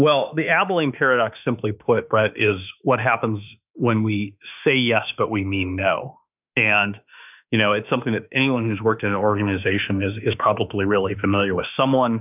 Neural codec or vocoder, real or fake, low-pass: autoencoder, 48 kHz, 32 numbers a frame, DAC-VAE, trained on Japanese speech; fake; 3.6 kHz